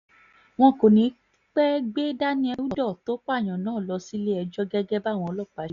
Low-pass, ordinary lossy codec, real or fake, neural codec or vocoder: 7.2 kHz; none; real; none